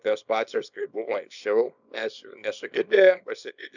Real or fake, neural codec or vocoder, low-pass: fake; codec, 24 kHz, 0.9 kbps, WavTokenizer, small release; 7.2 kHz